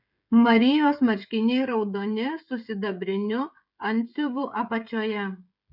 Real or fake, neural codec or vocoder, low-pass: fake; codec, 16 kHz, 16 kbps, FreqCodec, smaller model; 5.4 kHz